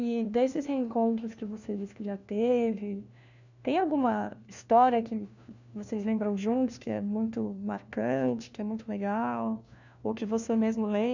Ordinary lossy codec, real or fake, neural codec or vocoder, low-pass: none; fake; codec, 16 kHz, 1 kbps, FunCodec, trained on LibriTTS, 50 frames a second; 7.2 kHz